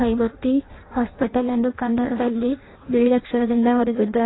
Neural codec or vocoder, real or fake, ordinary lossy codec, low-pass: codec, 16 kHz in and 24 kHz out, 0.6 kbps, FireRedTTS-2 codec; fake; AAC, 16 kbps; 7.2 kHz